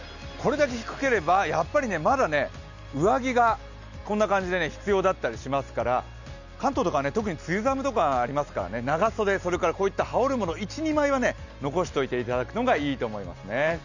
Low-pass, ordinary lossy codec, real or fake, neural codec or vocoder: 7.2 kHz; none; real; none